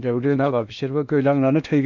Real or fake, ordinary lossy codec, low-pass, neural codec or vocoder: fake; none; 7.2 kHz; codec, 16 kHz in and 24 kHz out, 0.8 kbps, FocalCodec, streaming, 65536 codes